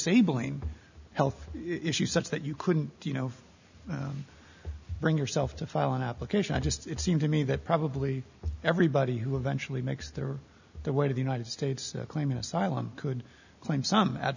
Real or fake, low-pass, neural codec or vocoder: real; 7.2 kHz; none